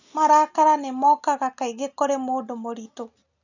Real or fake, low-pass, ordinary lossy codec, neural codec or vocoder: real; 7.2 kHz; none; none